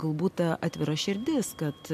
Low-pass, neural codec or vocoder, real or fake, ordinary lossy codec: 14.4 kHz; none; real; MP3, 64 kbps